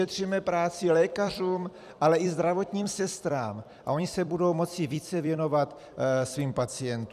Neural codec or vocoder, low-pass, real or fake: vocoder, 44.1 kHz, 128 mel bands every 256 samples, BigVGAN v2; 14.4 kHz; fake